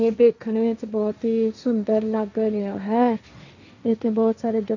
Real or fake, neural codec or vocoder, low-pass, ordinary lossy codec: fake; codec, 16 kHz, 1.1 kbps, Voila-Tokenizer; 7.2 kHz; none